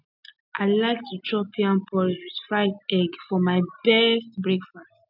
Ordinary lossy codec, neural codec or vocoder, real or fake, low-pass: none; none; real; 5.4 kHz